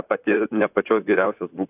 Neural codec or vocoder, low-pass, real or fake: vocoder, 44.1 kHz, 128 mel bands, Pupu-Vocoder; 3.6 kHz; fake